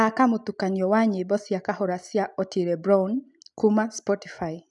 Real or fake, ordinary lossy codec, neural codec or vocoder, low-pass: real; none; none; 10.8 kHz